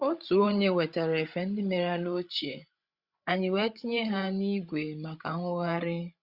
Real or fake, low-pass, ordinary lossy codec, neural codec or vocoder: fake; 5.4 kHz; Opus, 64 kbps; codec, 16 kHz, 16 kbps, FreqCodec, larger model